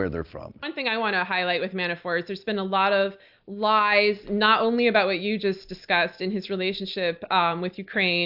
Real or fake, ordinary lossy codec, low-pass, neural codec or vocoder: real; AAC, 48 kbps; 5.4 kHz; none